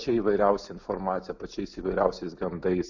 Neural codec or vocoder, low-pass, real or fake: none; 7.2 kHz; real